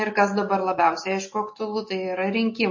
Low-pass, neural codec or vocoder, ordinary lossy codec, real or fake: 7.2 kHz; none; MP3, 32 kbps; real